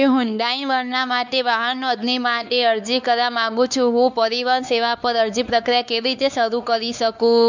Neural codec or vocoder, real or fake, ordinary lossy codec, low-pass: codec, 16 kHz, 4 kbps, X-Codec, WavLM features, trained on Multilingual LibriSpeech; fake; none; 7.2 kHz